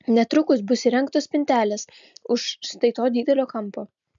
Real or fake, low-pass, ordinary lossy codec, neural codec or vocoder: real; 7.2 kHz; MP3, 64 kbps; none